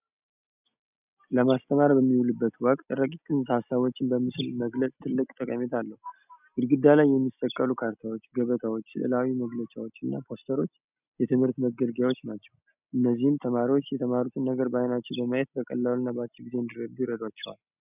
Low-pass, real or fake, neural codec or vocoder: 3.6 kHz; real; none